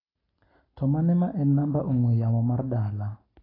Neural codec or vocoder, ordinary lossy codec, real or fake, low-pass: none; AAC, 24 kbps; real; 5.4 kHz